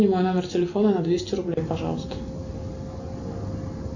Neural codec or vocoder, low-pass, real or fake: none; 7.2 kHz; real